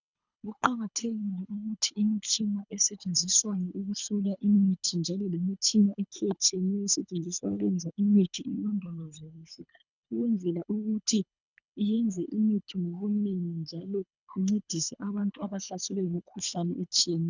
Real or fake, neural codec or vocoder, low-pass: fake; codec, 24 kHz, 3 kbps, HILCodec; 7.2 kHz